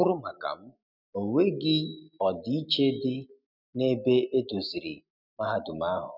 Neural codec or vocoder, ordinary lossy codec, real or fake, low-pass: none; none; real; 5.4 kHz